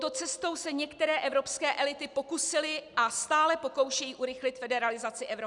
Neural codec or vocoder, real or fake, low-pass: none; real; 10.8 kHz